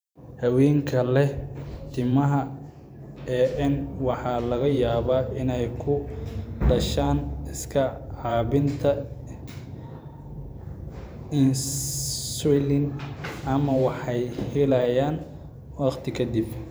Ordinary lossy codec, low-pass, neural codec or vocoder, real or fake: none; none; vocoder, 44.1 kHz, 128 mel bands every 512 samples, BigVGAN v2; fake